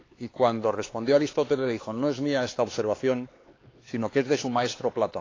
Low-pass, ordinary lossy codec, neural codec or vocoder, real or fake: 7.2 kHz; AAC, 32 kbps; codec, 16 kHz, 4 kbps, X-Codec, HuBERT features, trained on LibriSpeech; fake